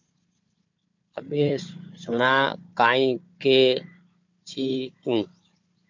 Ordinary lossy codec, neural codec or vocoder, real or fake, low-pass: MP3, 48 kbps; codec, 16 kHz, 4 kbps, FunCodec, trained on Chinese and English, 50 frames a second; fake; 7.2 kHz